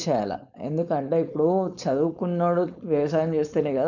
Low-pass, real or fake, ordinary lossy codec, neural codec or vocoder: 7.2 kHz; fake; none; codec, 16 kHz, 4.8 kbps, FACodec